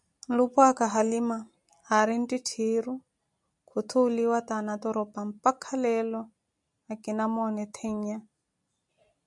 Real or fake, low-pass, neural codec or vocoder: real; 10.8 kHz; none